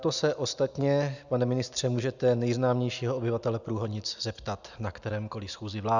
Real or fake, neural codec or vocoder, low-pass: real; none; 7.2 kHz